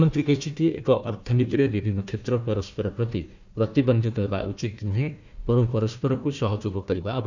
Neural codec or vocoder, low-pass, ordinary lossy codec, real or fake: codec, 16 kHz, 1 kbps, FunCodec, trained on Chinese and English, 50 frames a second; 7.2 kHz; none; fake